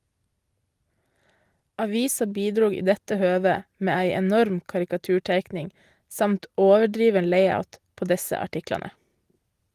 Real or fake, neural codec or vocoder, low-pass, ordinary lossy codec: real; none; 14.4 kHz; Opus, 24 kbps